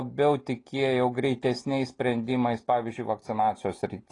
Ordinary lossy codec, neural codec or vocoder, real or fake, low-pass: AAC, 32 kbps; none; real; 10.8 kHz